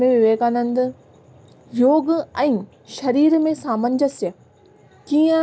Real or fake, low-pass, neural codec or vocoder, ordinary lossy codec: real; none; none; none